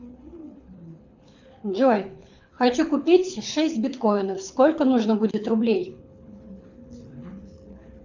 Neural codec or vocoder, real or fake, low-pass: codec, 24 kHz, 6 kbps, HILCodec; fake; 7.2 kHz